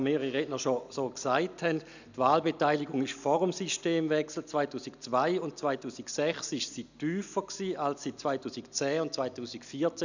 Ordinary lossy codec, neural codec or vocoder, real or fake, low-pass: none; none; real; 7.2 kHz